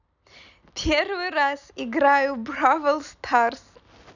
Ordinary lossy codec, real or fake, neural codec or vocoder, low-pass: none; real; none; 7.2 kHz